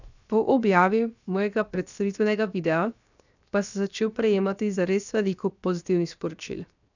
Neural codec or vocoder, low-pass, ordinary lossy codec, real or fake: codec, 16 kHz, 0.7 kbps, FocalCodec; 7.2 kHz; none; fake